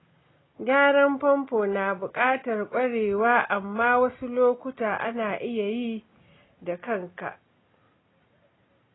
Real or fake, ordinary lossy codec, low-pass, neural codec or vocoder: real; AAC, 16 kbps; 7.2 kHz; none